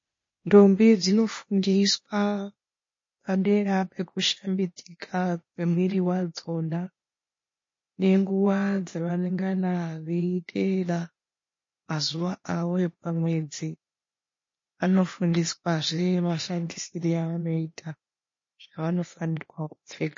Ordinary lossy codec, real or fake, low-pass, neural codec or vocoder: MP3, 32 kbps; fake; 7.2 kHz; codec, 16 kHz, 0.8 kbps, ZipCodec